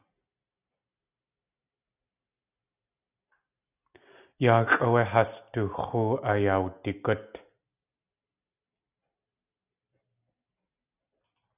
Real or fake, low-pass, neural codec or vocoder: real; 3.6 kHz; none